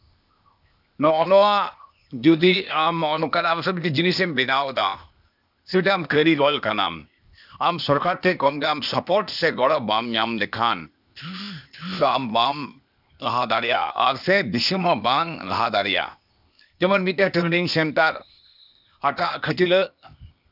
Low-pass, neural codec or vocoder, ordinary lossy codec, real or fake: 5.4 kHz; codec, 16 kHz, 0.8 kbps, ZipCodec; none; fake